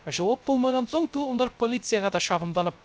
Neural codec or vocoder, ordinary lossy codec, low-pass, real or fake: codec, 16 kHz, 0.3 kbps, FocalCodec; none; none; fake